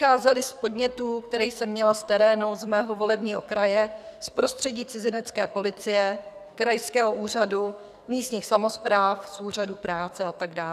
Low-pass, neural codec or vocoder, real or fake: 14.4 kHz; codec, 44.1 kHz, 2.6 kbps, SNAC; fake